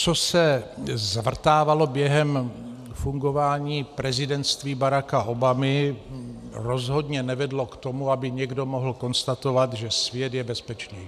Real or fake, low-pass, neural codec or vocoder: real; 14.4 kHz; none